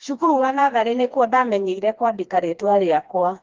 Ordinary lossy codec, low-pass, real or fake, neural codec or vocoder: Opus, 32 kbps; 7.2 kHz; fake; codec, 16 kHz, 2 kbps, FreqCodec, smaller model